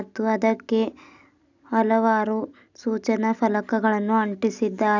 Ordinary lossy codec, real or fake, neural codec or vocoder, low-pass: none; real; none; 7.2 kHz